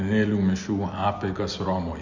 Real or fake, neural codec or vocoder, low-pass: real; none; 7.2 kHz